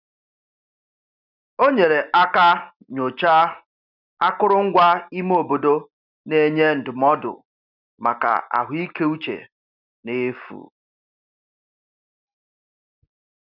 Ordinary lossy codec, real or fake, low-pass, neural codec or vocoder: none; real; 5.4 kHz; none